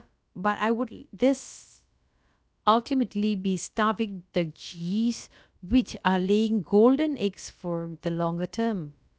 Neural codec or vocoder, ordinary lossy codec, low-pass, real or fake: codec, 16 kHz, about 1 kbps, DyCAST, with the encoder's durations; none; none; fake